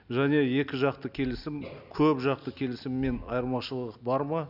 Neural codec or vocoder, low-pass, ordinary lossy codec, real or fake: none; 5.4 kHz; none; real